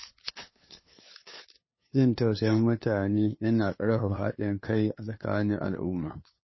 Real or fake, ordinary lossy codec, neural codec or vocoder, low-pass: fake; MP3, 24 kbps; codec, 16 kHz, 2 kbps, FunCodec, trained on LibriTTS, 25 frames a second; 7.2 kHz